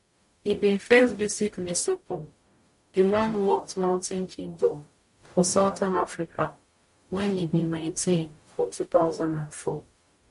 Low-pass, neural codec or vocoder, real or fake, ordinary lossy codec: 14.4 kHz; codec, 44.1 kHz, 0.9 kbps, DAC; fake; MP3, 48 kbps